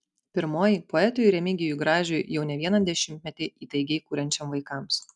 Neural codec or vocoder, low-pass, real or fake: none; 10.8 kHz; real